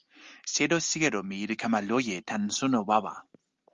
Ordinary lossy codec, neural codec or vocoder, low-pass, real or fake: Opus, 32 kbps; none; 7.2 kHz; real